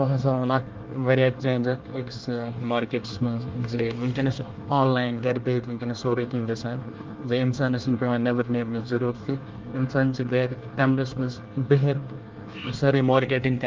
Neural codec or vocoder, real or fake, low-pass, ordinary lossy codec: codec, 24 kHz, 1 kbps, SNAC; fake; 7.2 kHz; Opus, 32 kbps